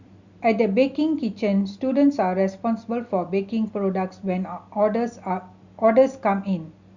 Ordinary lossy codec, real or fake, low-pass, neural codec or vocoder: Opus, 64 kbps; real; 7.2 kHz; none